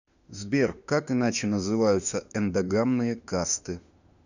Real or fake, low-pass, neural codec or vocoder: fake; 7.2 kHz; autoencoder, 48 kHz, 32 numbers a frame, DAC-VAE, trained on Japanese speech